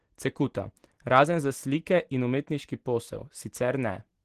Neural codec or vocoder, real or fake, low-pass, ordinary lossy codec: none; real; 14.4 kHz; Opus, 16 kbps